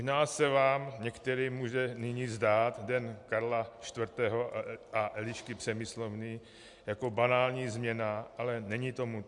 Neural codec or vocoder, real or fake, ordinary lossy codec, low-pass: none; real; MP3, 64 kbps; 10.8 kHz